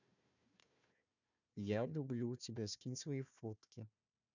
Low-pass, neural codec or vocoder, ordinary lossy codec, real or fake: 7.2 kHz; codec, 16 kHz, 1 kbps, FunCodec, trained on Chinese and English, 50 frames a second; none; fake